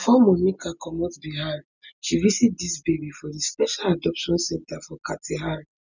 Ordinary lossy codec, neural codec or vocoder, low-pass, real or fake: none; none; 7.2 kHz; real